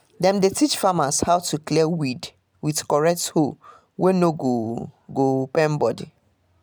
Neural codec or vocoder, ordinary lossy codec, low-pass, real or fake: none; none; none; real